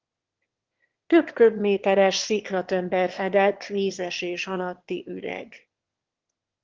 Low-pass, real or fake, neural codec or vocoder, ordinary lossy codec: 7.2 kHz; fake; autoencoder, 22.05 kHz, a latent of 192 numbers a frame, VITS, trained on one speaker; Opus, 16 kbps